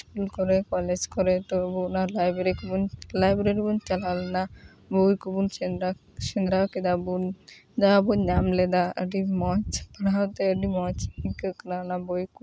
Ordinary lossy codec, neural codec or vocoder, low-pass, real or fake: none; none; none; real